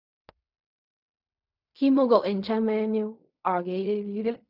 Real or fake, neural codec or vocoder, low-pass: fake; codec, 16 kHz in and 24 kHz out, 0.4 kbps, LongCat-Audio-Codec, fine tuned four codebook decoder; 5.4 kHz